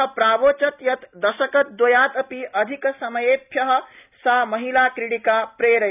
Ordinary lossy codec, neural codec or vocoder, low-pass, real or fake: none; none; 3.6 kHz; real